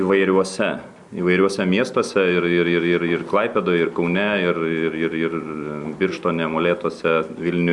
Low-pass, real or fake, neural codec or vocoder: 10.8 kHz; real; none